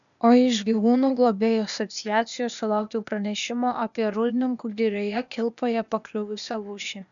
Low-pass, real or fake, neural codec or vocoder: 7.2 kHz; fake; codec, 16 kHz, 0.8 kbps, ZipCodec